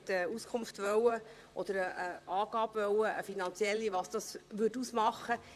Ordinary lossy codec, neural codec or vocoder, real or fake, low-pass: none; vocoder, 44.1 kHz, 128 mel bands, Pupu-Vocoder; fake; 14.4 kHz